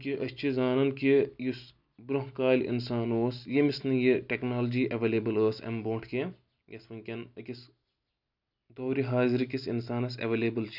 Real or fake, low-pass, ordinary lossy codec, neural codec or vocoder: real; 5.4 kHz; none; none